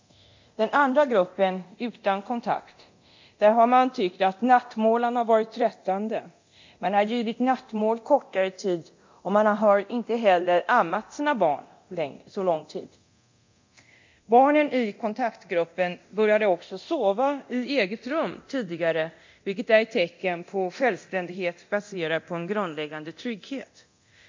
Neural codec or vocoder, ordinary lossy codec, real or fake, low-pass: codec, 24 kHz, 0.9 kbps, DualCodec; MP3, 48 kbps; fake; 7.2 kHz